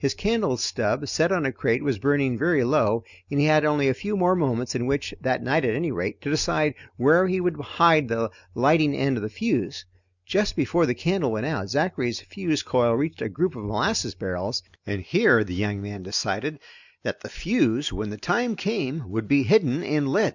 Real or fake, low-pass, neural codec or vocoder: real; 7.2 kHz; none